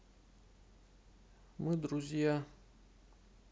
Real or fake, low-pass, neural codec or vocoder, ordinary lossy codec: real; none; none; none